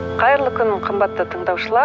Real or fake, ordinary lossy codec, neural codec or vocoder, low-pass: real; none; none; none